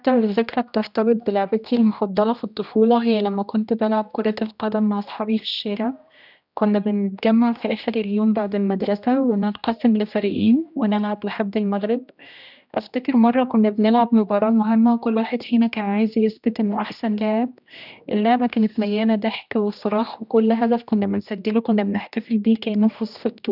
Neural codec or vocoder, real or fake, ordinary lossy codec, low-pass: codec, 16 kHz, 1 kbps, X-Codec, HuBERT features, trained on general audio; fake; none; 5.4 kHz